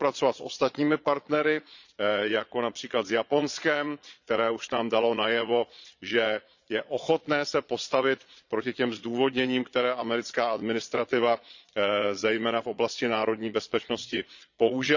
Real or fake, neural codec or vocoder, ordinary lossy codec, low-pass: fake; vocoder, 22.05 kHz, 80 mel bands, Vocos; none; 7.2 kHz